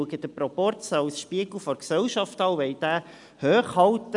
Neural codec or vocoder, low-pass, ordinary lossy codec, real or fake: none; 10.8 kHz; none; real